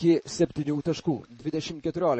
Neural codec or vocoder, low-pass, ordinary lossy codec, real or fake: vocoder, 44.1 kHz, 128 mel bands, Pupu-Vocoder; 10.8 kHz; MP3, 32 kbps; fake